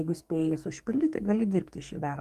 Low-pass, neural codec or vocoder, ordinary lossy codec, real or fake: 14.4 kHz; codec, 44.1 kHz, 2.6 kbps, SNAC; Opus, 32 kbps; fake